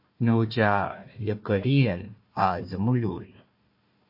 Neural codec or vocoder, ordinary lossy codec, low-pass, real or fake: codec, 16 kHz, 1 kbps, FunCodec, trained on Chinese and English, 50 frames a second; MP3, 32 kbps; 5.4 kHz; fake